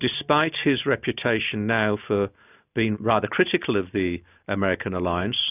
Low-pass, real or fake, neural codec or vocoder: 3.6 kHz; real; none